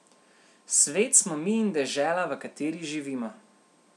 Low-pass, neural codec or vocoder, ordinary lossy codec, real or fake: none; none; none; real